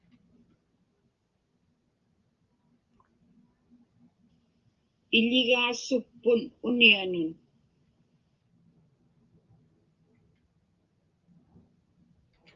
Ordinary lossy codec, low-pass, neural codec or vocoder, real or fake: Opus, 32 kbps; 7.2 kHz; none; real